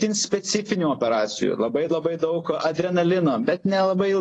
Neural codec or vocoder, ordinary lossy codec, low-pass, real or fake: vocoder, 44.1 kHz, 128 mel bands every 256 samples, BigVGAN v2; AAC, 32 kbps; 10.8 kHz; fake